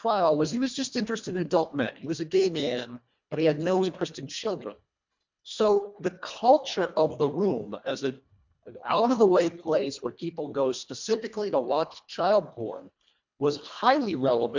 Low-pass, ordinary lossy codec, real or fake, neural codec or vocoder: 7.2 kHz; MP3, 64 kbps; fake; codec, 24 kHz, 1.5 kbps, HILCodec